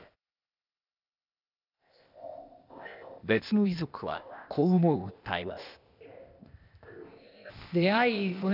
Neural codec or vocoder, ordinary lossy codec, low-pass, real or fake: codec, 16 kHz, 0.8 kbps, ZipCodec; none; 5.4 kHz; fake